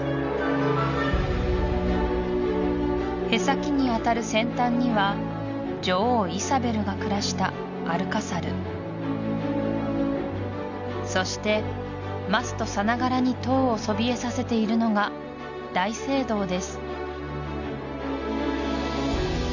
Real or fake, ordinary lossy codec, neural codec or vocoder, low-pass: real; none; none; 7.2 kHz